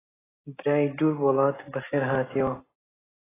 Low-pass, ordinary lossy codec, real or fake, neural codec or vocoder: 3.6 kHz; AAC, 16 kbps; real; none